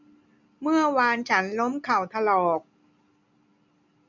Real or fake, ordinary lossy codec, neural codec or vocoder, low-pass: real; none; none; 7.2 kHz